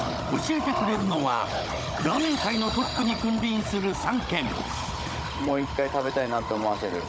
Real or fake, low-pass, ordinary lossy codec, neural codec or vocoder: fake; none; none; codec, 16 kHz, 16 kbps, FunCodec, trained on Chinese and English, 50 frames a second